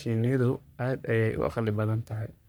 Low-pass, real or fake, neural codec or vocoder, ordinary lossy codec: none; fake; codec, 44.1 kHz, 3.4 kbps, Pupu-Codec; none